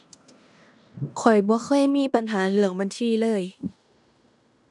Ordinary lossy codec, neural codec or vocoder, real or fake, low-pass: none; codec, 16 kHz in and 24 kHz out, 0.9 kbps, LongCat-Audio-Codec, fine tuned four codebook decoder; fake; 10.8 kHz